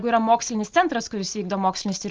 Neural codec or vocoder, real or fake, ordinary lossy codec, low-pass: none; real; Opus, 32 kbps; 7.2 kHz